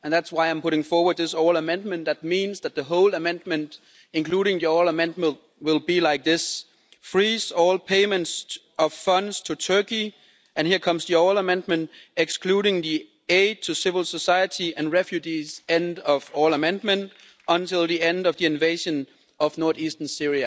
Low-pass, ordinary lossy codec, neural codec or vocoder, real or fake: none; none; none; real